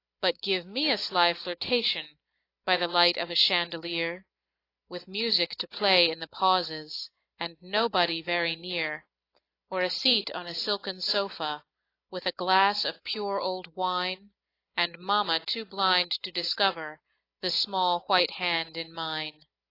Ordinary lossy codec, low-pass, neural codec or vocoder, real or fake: AAC, 32 kbps; 5.4 kHz; none; real